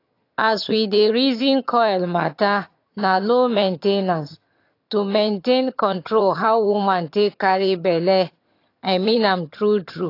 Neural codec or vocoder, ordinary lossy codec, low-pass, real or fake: vocoder, 22.05 kHz, 80 mel bands, HiFi-GAN; AAC, 32 kbps; 5.4 kHz; fake